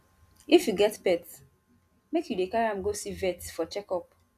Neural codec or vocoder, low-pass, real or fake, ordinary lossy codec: none; 14.4 kHz; real; none